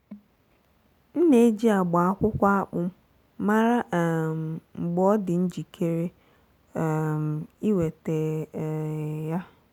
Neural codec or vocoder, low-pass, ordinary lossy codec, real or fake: none; 19.8 kHz; none; real